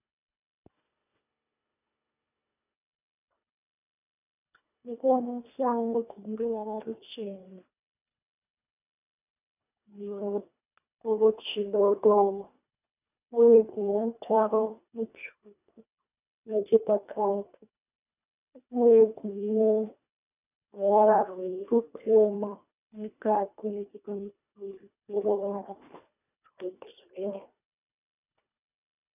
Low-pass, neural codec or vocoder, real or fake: 3.6 kHz; codec, 24 kHz, 1.5 kbps, HILCodec; fake